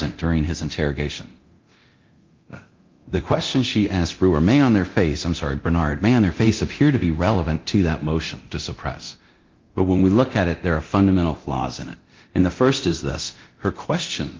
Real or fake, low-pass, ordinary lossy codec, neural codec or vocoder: fake; 7.2 kHz; Opus, 24 kbps; codec, 24 kHz, 0.9 kbps, DualCodec